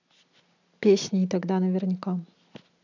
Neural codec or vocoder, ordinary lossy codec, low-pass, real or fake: none; none; 7.2 kHz; real